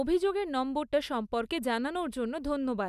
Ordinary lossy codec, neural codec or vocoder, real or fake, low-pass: none; none; real; 14.4 kHz